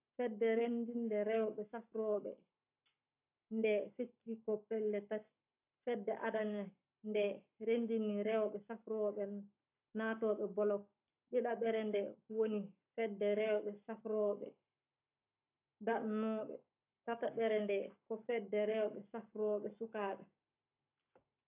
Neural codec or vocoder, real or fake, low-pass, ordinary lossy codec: codec, 44.1 kHz, 7.8 kbps, Pupu-Codec; fake; 3.6 kHz; AAC, 32 kbps